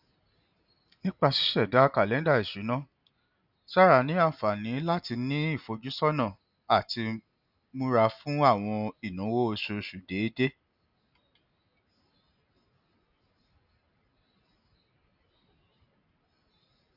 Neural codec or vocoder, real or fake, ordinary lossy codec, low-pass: none; real; none; 5.4 kHz